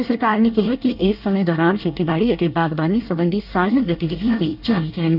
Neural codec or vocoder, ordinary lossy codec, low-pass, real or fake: codec, 24 kHz, 1 kbps, SNAC; none; 5.4 kHz; fake